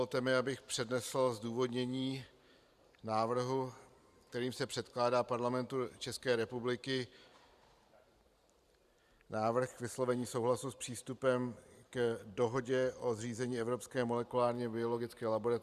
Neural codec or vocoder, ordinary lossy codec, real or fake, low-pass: vocoder, 44.1 kHz, 128 mel bands every 512 samples, BigVGAN v2; Opus, 64 kbps; fake; 14.4 kHz